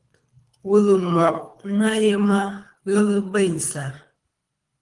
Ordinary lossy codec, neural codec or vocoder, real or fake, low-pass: Opus, 24 kbps; codec, 24 kHz, 3 kbps, HILCodec; fake; 10.8 kHz